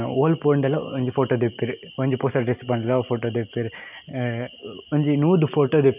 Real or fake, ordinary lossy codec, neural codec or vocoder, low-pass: real; none; none; 3.6 kHz